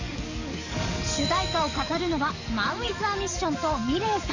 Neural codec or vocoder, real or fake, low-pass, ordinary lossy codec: codec, 44.1 kHz, 7.8 kbps, DAC; fake; 7.2 kHz; none